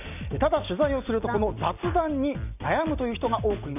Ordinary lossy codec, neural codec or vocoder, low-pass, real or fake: none; none; 3.6 kHz; real